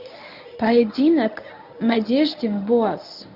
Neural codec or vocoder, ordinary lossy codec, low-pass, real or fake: codec, 24 kHz, 0.9 kbps, WavTokenizer, medium speech release version 1; none; 5.4 kHz; fake